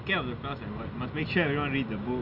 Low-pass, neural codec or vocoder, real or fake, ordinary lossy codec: 5.4 kHz; none; real; none